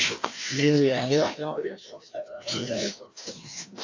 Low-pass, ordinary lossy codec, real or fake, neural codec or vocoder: 7.2 kHz; AAC, 48 kbps; fake; codec, 16 kHz, 1 kbps, FreqCodec, larger model